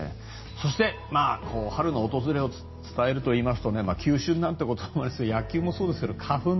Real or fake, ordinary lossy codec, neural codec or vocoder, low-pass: real; MP3, 24 kbps; none; 7.2 kHz